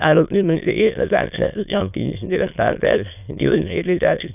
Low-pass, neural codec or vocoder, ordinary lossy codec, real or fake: 3.6 kHz; autoencoder, 22.05 kHz, a latent of 192 numbers a frame, VITS, trained on many speakers; none; fake